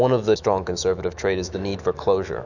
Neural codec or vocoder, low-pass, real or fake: autoencoder, 48 kHz, 128 numbers a frame, DAC-VAE, trained on Japanese speech; 7.2 kHz; fake